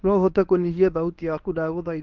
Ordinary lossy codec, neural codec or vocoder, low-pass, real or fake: Opus, 24 kbps; codec, 24 kHz, 0.9 kbps, WavTokenizer, medium speech release version 1; 7.2 kHz; fake